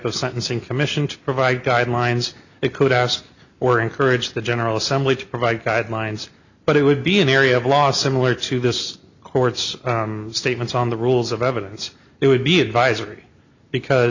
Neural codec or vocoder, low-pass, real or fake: none; 7.2 kHz; real